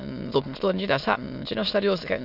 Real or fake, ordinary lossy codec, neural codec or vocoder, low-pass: fake; none; autoencoder, 22.05 kHz, a latent of 192 numbers a frame, VITS, trained on many speakers; 5.4 kHz